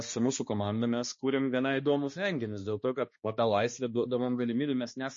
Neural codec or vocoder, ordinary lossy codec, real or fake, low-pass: codec, 16 kHz, 2 kbps, X-Codec, HuBERT features, trained on balanced general audio; MP3, 32 kbps; fake; 7.2 kHz